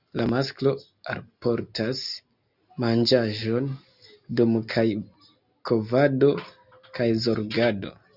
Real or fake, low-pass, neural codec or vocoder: real; 5.4 kHz; none